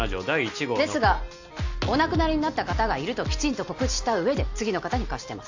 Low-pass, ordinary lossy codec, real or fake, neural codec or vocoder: 7.2 kHz; AAC, 48 kbps; real; none